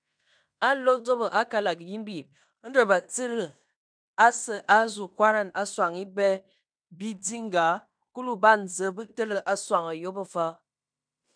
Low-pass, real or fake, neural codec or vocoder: 9.9 kHz; fake; codec, 16 kHz in and 24 kHz out, 0.9 kbps, LongCat-Audio-Codec, fine tuned four codebook decoder